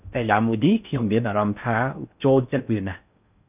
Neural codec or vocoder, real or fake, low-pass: codec, 16 kHz in and 24 kHz out, 0.6 kbps, FocalCodec, streaming, 4096 codes; fake; 3.6 kHz